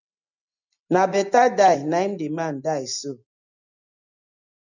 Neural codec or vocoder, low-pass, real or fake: none; 7.2 kHz; real